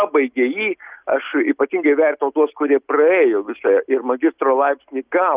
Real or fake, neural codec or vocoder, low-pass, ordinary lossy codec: real; none; 3.6 kHz; Opus, 24 kbps